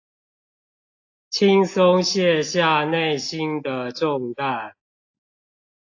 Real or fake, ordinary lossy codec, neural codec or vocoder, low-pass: real; AAC, 48 kbps; none; 7.2 kHz